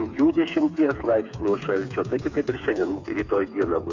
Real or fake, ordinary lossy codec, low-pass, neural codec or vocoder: fake; MP3, 48 kbps; 7.2 kHz; codec, 16 kHz, 4 kbps, FreqCodec, smaller model